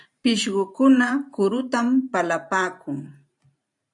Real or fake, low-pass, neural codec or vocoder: fake; 10.8 kHz; vocoder, 44.1 kHz, 128 mel bands every 512 samples, BigVGAN v2